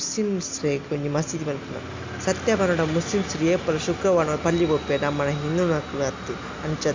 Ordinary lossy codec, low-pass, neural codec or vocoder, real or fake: MP3, 48 kbps; 7.2 kHz; none; real